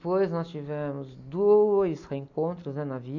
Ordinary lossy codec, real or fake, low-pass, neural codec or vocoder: none; real; 7.2 kHz; none